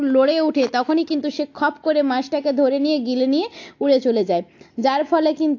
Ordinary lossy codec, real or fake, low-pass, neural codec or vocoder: AAC, 48 kbps; real; 7.2 kHz; none